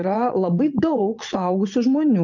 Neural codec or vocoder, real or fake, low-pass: vocoder, 22.05 kHz, 80 mel bands, Vocos; fake; 7.2 kHz